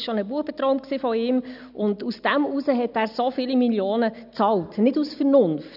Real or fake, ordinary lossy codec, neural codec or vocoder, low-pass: real; none; none; 5.4 kHz